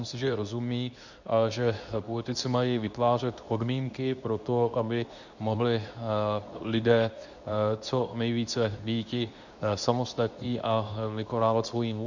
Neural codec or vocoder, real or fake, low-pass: codec, 24 kHz, 0.9 kbps, WavTokenizer, medium speech release version 2; fake; 7.2 kHz